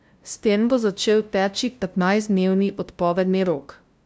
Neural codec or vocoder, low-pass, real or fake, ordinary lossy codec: codec, 16 kHz, 0.5 kbps, FunCodec, trained on LibriTTS, 25 frames a second; none; fake; none